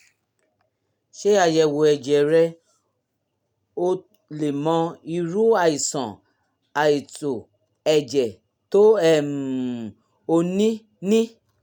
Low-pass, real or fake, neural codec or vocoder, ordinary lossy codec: 19.8 kHz; real; none; none